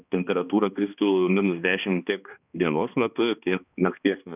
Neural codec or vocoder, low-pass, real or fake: codec, 16 kHz, 2 kbps, X-Codec, HuBERT features, trained on balanced general audio; 3.6 kHz; fake